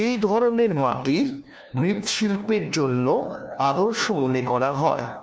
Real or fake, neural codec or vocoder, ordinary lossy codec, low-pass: fake; codec, 16 kHz, 1 kbps, FunCodec, trained on LibriTTS, 50 frames a second; none; none